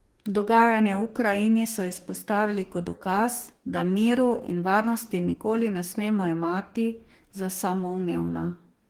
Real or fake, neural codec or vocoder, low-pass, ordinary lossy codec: fake; codec, 44.1 kHz, 2.6 kbps, DAC; 19.8 kHz; Opus, 24 kbps